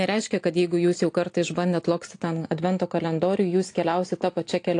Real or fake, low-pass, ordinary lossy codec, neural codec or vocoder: real; 9.9 kHz; AAC, 48 kbps; none